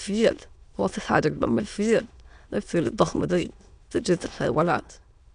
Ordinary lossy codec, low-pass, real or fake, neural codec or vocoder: MP3, 96 kbps; 9.9 kHz; fake; autoencoder, 22.05 kHz, a latent of 192 numbers a frame, VITS, trained on many speakers